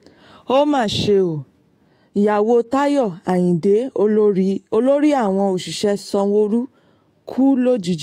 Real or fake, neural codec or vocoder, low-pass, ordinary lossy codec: fake; autoencoder, 48 kHz, 128 numbers a frame, DAC-VAE, trained on Japanese speech; 19.8 kHz; AAC, 48 kbps